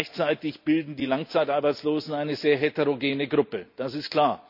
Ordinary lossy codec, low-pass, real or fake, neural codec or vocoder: none; 5.4 kHz; real; none